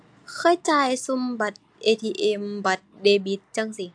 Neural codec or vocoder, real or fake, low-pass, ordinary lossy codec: none; real; 9.9 kHz; AAC, 64 kbps